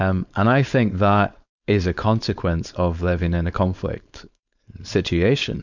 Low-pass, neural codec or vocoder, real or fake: 7.2 kHz; codec, 16 kHz, 4.8 kbps, FACodec; fake